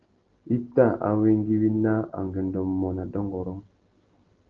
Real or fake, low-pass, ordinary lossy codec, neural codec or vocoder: real; 7.2 kHz; Opus, 16 kbps; none